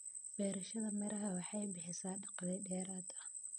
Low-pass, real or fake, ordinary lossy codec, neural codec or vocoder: none; real; none; none